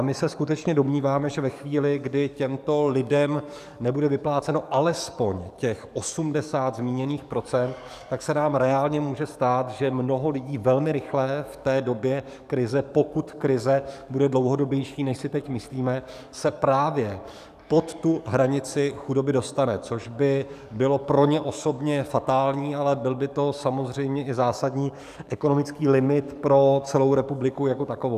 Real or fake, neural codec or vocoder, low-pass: fake; codec, 44.1 kHz, 7.8 kbps, DAC; 14.4 kHz